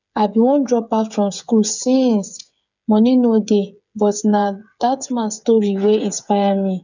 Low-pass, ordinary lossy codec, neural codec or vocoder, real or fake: 7.2 kHz; none; codec, 16 kHz, 8 kbps, FreqCodec, smaller model; fake